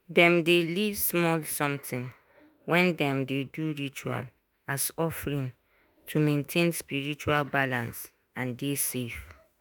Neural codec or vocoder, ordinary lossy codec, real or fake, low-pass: autoencoder, 48 kHz, 32 numbers a frame, DAC-VAE, trained on Japanese speech; none; fake; none